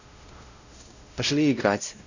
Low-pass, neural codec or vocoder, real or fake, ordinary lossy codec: 7.2 kHz; codec, 16 kHz, 0.5 kbps, X-Codec, WavLM features, trained on Multilingual LibriSpeech; fake; none